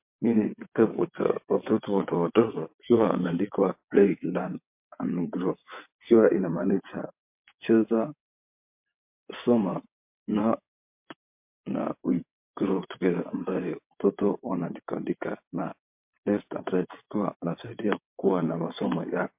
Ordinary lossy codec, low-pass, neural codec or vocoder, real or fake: MP3, 32 kbps; 3.6 kHz; vocoder, 22.05 kHz, 80 mel bands, WaveNeXt; fake